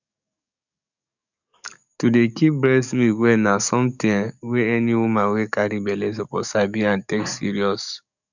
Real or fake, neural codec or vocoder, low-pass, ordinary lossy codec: fake; codec, 44.1 kHz, 7.8 kbps, DAC; 7.2 kHz; none